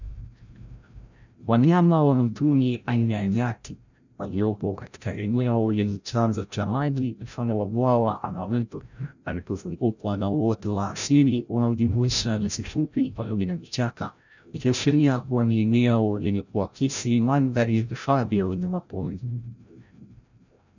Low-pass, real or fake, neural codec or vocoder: 7.2 kHz; fake; codec, 16 kHz, 0.5 kbps, FreqCodec, larger model